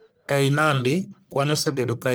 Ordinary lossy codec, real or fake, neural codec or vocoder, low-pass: none; fake; codec, 44.1 kHz, 1.7 kbps, Pupu-Codec; none